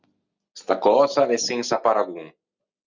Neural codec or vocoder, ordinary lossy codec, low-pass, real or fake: none; Opus, 64 kbps; 7.2 kHz; real